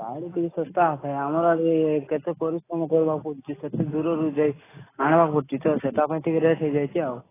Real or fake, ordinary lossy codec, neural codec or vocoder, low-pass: real; AAC, 16 kbps; none; 3.6 kHz